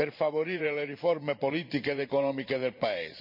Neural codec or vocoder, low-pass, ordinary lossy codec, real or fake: vocoder, 44.1 kHz, 128 mel bands every 512 samples, BigVGAN v2; 5.4 kHz; none; fake